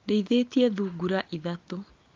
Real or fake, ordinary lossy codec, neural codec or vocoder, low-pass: real; Opus, 24 kbps; none; 7.2 kHz